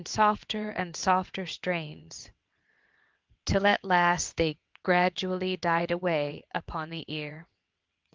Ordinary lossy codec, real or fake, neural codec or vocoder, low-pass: Opus, 32 kbps; fake; vocoder, 22.05 kHz, 80 mel bands, WaveNeXt; 7.2 kHz